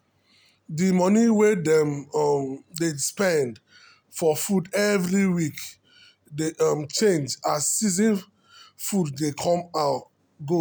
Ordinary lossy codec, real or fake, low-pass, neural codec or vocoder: none; real; none; none